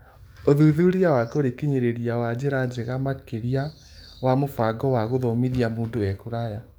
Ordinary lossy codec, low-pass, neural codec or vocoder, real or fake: none; none; codec, 44.1 kHz, 7.8 kbps, DAC; fake